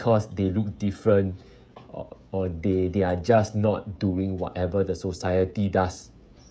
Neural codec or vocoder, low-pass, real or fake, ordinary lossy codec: codec, 16 kHz, 16 kbps, FreqCodec, smaller model; none; fake; none